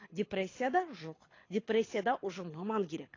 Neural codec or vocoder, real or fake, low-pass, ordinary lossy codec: codec, 24 kHz, 6 kbps, HILCodec; fake; 7.2 kHz; AAC, 32 kbps